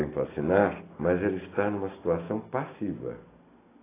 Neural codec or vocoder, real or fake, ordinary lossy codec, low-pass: none; real; AAC, 16 kbps; 3.6 kHz